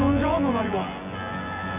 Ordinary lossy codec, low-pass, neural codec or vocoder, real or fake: none; 3.6 kHz; vocoder, 24 kHz, 100 mel bands, Vocos; fake